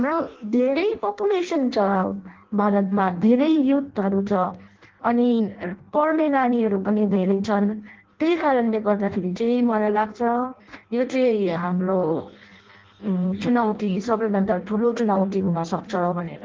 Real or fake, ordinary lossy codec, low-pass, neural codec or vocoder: fake; Opus, 16 kbps; 7.2 kHz; codec, 16 kHz in and 24 kHz out, 0.6 kbps, FireRedTTS-2 codec